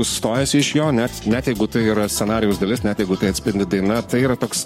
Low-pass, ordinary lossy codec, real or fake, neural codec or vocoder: 19.8 kHz; MP3, 64 kbps; fake; codec, 44.1 kHz, 7.8 kbps, Pupu-Codec